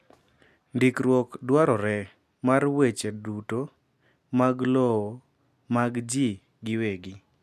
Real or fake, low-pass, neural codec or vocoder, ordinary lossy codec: real; 14.4 kHz; none; none